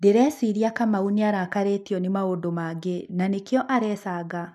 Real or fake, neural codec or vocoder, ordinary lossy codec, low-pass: real; none; none; 14.4 kHz